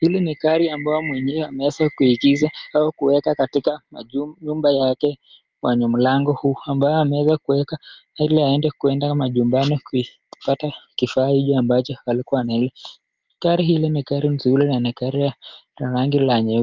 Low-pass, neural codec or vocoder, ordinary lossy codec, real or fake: 7.2 kHz; none; Opus, 32 kbps; real